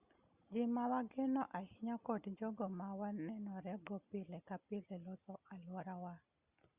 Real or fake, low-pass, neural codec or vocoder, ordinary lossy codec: real; 3.6 kHz; none; Opus, 64 kbps